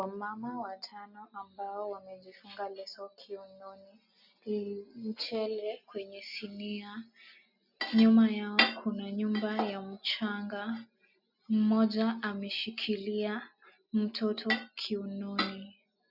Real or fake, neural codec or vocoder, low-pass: real; none; 5.4 kHz